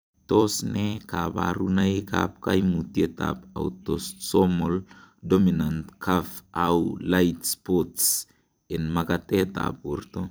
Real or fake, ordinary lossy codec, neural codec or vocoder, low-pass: fake; none; vocoder, 44.1 kHz, 128 mel bands every 256 samples, BigVGAN v2; none